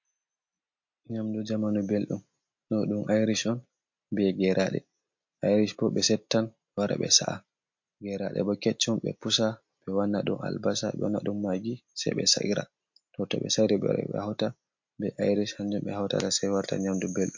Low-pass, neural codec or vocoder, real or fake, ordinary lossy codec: 7.2 kHz; none; real; MP3, 48 kbps